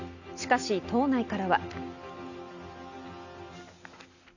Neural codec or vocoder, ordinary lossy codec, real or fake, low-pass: none; none; real; 7.2 kHz